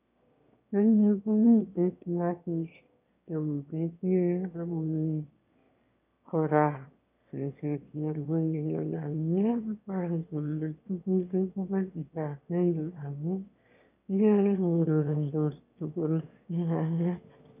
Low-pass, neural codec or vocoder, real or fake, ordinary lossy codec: 3.6 kHz; autoencoder, 22.05 kHz, a latent of 192 numbers a frame, VITS, trained on one speaker; fake; none